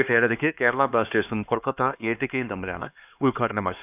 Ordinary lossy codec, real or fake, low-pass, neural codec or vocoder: none; fake; 3.6 kHz; codec, 16 kHz, 2 kbps, X-Codec, HuBERT features, trained on LibriSpeech